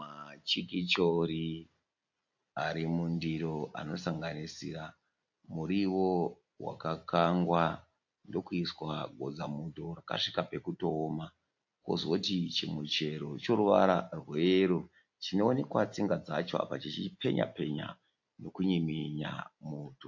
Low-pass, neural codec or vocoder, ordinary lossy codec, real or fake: 7.2 kHz; none; AAC, 48 kbps; real